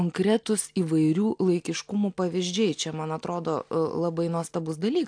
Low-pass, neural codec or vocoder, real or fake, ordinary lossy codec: 9.9 kHz; none; real; AAC, 64 kbps